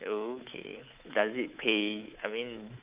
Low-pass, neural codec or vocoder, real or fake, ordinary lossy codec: 3.6 kHz; codec, 24 kHz, 3.1 kbps, DualCodec; fake; Opus, 24 kbps